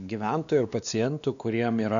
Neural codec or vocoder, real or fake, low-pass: codec, 16 kHz, 2 kbps, X-Codec, WavLM features, trained on Multilingual LibriSpeech; fake; 7.2 kHz